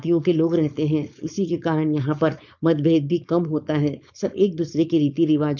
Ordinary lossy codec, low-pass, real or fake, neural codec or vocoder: MP3, 64 kbps; 7.2 kHz; fake; codec, 16 kHz, 4.8 kbps, FACodec